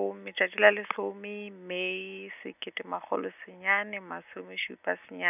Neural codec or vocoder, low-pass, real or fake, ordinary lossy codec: none; 3.6 kHz; real; none